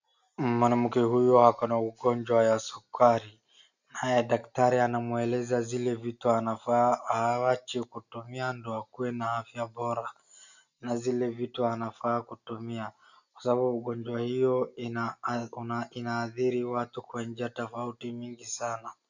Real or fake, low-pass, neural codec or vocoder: real; 7.2 kHz; none